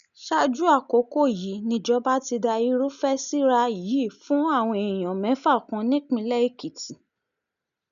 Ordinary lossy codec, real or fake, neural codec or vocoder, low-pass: none; real; none; 7.2 kHz